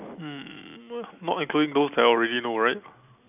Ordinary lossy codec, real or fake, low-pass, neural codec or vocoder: none; real; 3.6 kHz; none